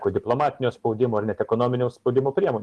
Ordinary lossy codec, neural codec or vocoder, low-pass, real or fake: Opus, 16 kbps; none; 10.8 kHz; real